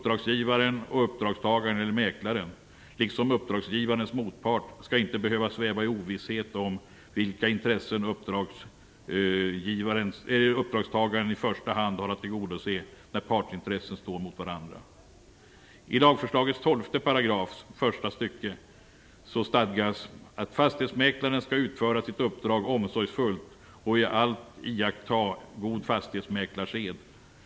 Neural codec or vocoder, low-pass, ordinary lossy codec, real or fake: none; none; none; real